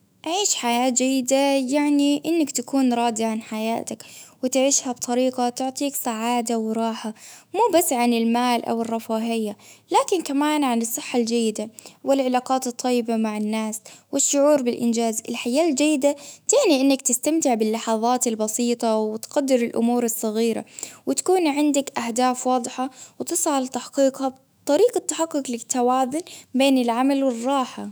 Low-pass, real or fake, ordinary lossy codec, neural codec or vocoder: none; fake; none; autoencoder, 48 kHz, 128 numbers a frame, DAC-VAE, trained on Japanese speech